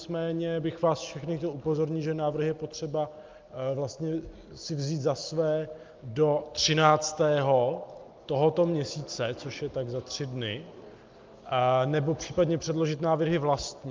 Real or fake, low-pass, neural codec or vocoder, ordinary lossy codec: real; 7.2 kHz; none; Opus, 24 kbps